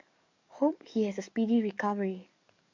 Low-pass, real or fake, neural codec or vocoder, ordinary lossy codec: 7.2 kHz; fake; codec, 44.1 kHz, 7.8 kbps, DAC; MP3, 64 kbps